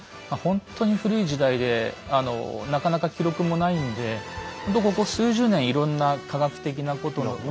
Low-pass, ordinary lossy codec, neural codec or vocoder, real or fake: none; none; none; real